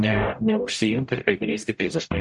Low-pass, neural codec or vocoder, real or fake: 10.8 kHz; codec, 44.1 kHz, 0.9 kbps, DAC; fake